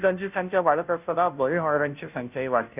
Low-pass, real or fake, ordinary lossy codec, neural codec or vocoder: 3.6 kHz; fake; none; codec, 16 kHz, 0.5 kbps, FunCodec, trained on Chinese and English, 25 frames a second